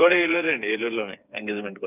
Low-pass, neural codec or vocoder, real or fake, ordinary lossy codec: 3.6 kHz; codec, 16 kHz, 4 kbps, FreqCodec, smaller model; fake; none